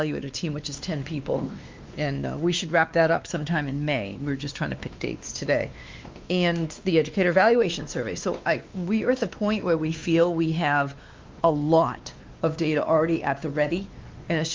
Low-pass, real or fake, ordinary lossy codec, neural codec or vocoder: 7.2 kHz; fake; Opus, 24 kbps; codec, 16 kHz, 2 kbps, X-Codec, WavLM features, trained on Multilingual LibriSpeech